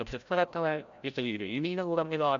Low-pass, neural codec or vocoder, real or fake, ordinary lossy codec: 7.2 kHz; codec, 16 kHz, 0.5 kbps, FreqCodec, larger model; fake; AAC, 64 kbps